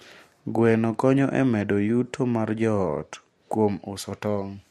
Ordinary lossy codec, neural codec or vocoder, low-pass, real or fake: MP3, 64 kbps; none; 14.4 kHz; real